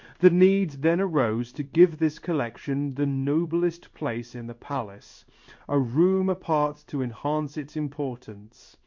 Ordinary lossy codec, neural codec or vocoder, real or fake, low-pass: MP3, 48 kbps; codec, 16 kHz in and 24 kHz out, 1 kbps, XY-Tokenizer; fake; 7.2 kHz